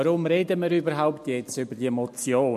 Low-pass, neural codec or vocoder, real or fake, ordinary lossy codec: 14.4 kHz; none; real; MP3, 64 kbps